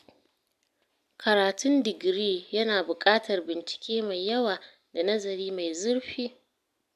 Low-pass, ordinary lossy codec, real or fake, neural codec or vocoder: 14.4 kHz; none; real; none